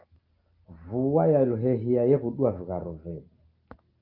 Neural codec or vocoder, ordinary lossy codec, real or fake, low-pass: none; Opus, 32 kbps; real; 5.4 kHz